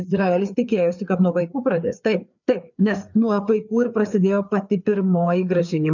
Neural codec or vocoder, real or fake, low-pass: codec, 16 kHz, 4 kbps, FreqCodec, larger model; fake; 7.2 kHz